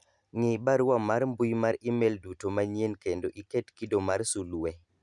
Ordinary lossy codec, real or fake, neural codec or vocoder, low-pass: none; real; none; 10.8 kHz